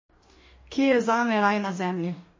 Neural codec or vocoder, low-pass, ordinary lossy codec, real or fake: codec, 44.1 kHz, 2.6 kbps, DAC; 7.2 kHz; MP3, 32 kbps; fake